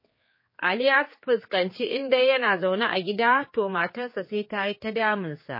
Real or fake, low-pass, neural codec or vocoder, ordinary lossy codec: fake; 5.4 kHz; codec, 16 kHz, 4 kbps, X-Codec, HuBERT features, trained on general audio; MP3, 24 kbps